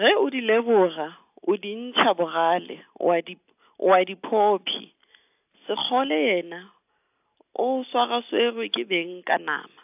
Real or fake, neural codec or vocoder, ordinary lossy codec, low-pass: real; none; AAC, 32 kbps; 3.6 kHz